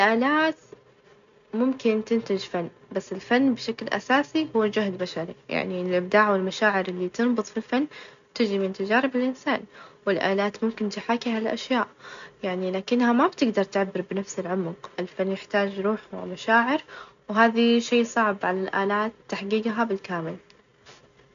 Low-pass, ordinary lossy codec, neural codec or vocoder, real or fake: 7.2 kHz; AAC, 96 kbps; none; real